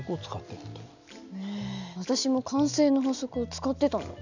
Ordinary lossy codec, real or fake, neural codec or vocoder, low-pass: none; real; none; 7.2 kHz